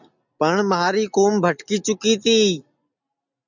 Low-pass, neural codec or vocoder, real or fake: 7.2 kHz; none; real